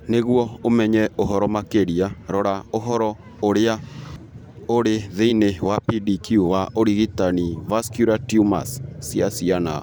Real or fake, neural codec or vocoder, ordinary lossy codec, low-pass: fake; vocoder, 44.1 kHz, 128 mel bands every 512 samples, BigVGAN v2; none; none